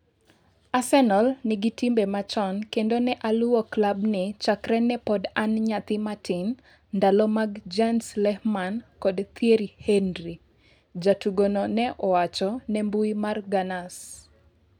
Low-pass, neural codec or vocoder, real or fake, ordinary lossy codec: 19.8 kHz; none; real; none